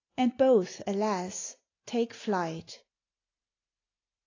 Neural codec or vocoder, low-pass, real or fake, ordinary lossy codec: none; 7.2 kHz; real; AAC, 32 kbps